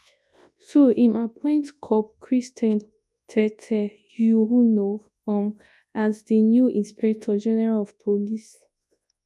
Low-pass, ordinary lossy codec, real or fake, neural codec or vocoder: none; none; fake; codec, 24 kHz, 0.9 kbps, WavTokenizer, large speech release